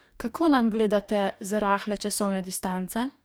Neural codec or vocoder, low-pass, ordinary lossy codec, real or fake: codec, 44.1 kHz, 2.6 kbps, DAC; none; none; fake